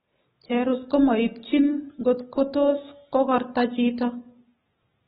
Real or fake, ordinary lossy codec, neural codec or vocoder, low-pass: real; AAC, 16 kbps; none; 19.8 kHz